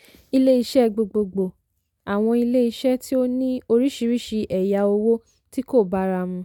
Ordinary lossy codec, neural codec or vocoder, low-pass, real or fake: none; none; 19.8 kHz; real